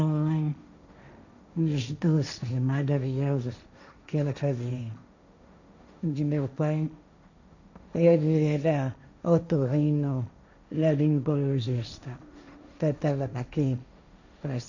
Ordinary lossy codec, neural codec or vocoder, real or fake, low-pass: none; codec, 16 kHz, 1.1 kbps, Voila-Tokenizer; fake; 7.2 kHz